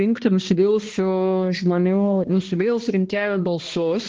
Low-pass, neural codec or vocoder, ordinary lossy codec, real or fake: 7.2 kHz; codec, 16 kHz, 1 kbps, X-Codec, HuBERT features, trained on balanced general audio; Opus, 16 kbps; fake